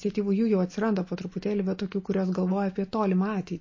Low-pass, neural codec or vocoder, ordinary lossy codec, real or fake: 7.2 kHz; none; MP3, 32 kbps; real